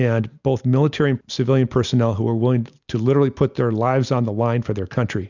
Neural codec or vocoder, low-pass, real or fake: none; 7.2 kHz; real